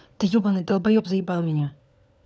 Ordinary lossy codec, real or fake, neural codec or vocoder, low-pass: none; fake; codec, 16 kHz, 4 kbps, FunCodec, trained on LibriTTS, 50 frames a second; none